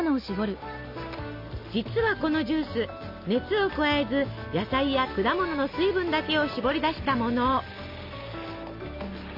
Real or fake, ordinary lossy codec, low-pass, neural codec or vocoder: real; AAC, 48 kbps; 5.4 kHz; none